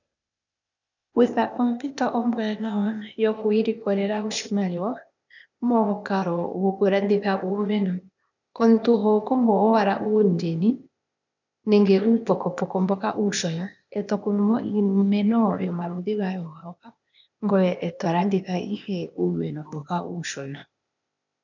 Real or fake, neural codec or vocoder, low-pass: fake; codec, 16 kHz, 0.8 kbps, ZipCodec; 7.2 kHz